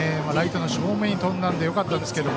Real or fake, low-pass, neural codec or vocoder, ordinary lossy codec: real; none; none; none